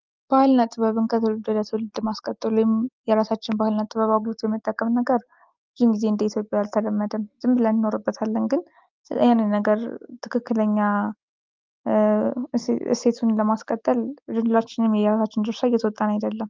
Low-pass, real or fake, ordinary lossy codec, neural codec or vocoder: 7.2 kHz; real; Opus, 24 kbps; none